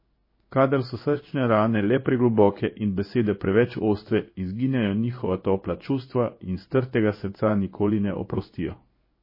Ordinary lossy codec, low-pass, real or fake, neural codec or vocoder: MP3, 24 kbps; 5.4 kHz; fake; codec, 16 kHz in and 24 kHz out, 1 kbps, XY-Tokenizer